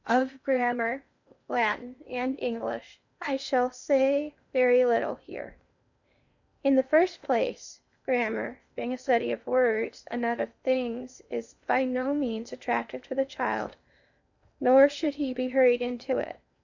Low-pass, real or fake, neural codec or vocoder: 7.2 kHz; fake; codec, 16 kHz in and 24 kHz out, 0.8 kbps, FocalCodec, streaming, 65536 codes